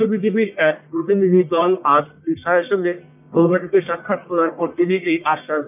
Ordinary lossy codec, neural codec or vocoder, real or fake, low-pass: none; codec, 44.1 kHz, 1.7 kbps, Pupu-Codec; fake; 3.6 kHz